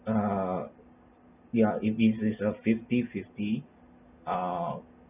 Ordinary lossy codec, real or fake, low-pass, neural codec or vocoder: none; real; 3.6 kHz; none